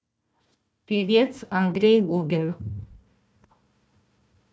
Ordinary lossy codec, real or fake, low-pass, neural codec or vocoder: none; fake; none; codec, 16 kHz, 1 kbps, FunCodec, trained on Chinese and English, 50 frames a second